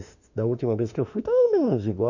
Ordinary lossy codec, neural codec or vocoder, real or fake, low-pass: none; autoencoder, 48 kHz, 32 numbers a frame, DAC-VAE, trained on Japanese speech; fake; 7.2 kHz